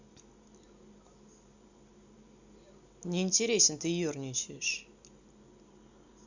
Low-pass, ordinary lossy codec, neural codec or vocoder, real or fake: 7.2 kHz; Opus, 64 kbps; none; real